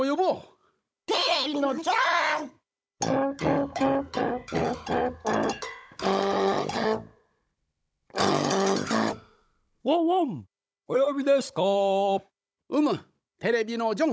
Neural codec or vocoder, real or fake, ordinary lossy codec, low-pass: codec, 16 kHz, 16 kbps, FunCodec, trained on Chinese and English, 50 frames a second; fake; none; none